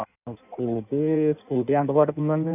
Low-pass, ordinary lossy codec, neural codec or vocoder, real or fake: 3.6 kHz; none; codec, 16 kHz in and 24 kHz out, 1.1 kbps, FireRedTTS-2 codec; fake